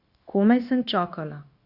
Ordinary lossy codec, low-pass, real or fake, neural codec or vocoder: Opus, 64 kbps; 5.4 kHz; fake; codec, 16 kHz, 0.9 kbps, LongCat-Audio-Codec